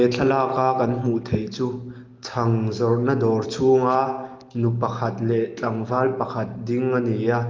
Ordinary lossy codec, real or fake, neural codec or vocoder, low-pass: Opus, 32 kbps; real; none; 7.2 kHz